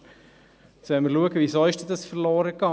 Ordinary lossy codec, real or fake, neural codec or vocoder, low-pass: none; real; none; none